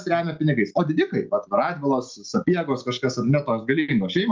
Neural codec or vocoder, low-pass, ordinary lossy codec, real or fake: none; 7.2 kHz; Opus, 32 kbps; real